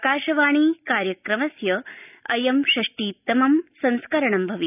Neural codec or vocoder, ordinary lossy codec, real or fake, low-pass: none; none; real; 3.6 kHz